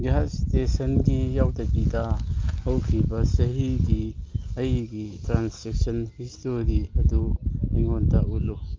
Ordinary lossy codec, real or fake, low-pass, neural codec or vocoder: Opus, 32 kbps; real; 7.2 kHz; none